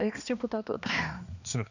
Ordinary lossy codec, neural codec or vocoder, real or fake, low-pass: none; codec, 16 kHz, 2 kbps, X-Codec, HuBERT features, trained on balanced general audio; fake; 7.2 kHz